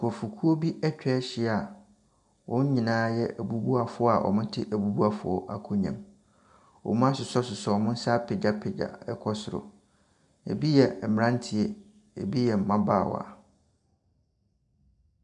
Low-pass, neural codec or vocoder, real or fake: 10.8 kHz; none; real